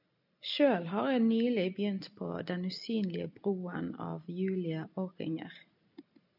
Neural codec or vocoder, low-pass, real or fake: none; 5.4 kHz; real